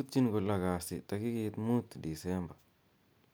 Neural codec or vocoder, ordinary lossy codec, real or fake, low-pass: none; none; real; none